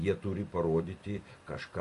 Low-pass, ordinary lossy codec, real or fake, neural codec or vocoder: 14.4 kHz; MP3, 48 kbps; fake; vocoder, 44.1 kHz, 128 mel bands every 256 samples, BigVGAN v2